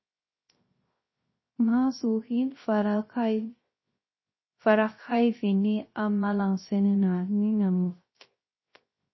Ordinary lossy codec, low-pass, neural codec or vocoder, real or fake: MP3, 24 kbps; 7.2 kHz; codec, 16 kHz, 0.3 kbps, FocalCodec; fake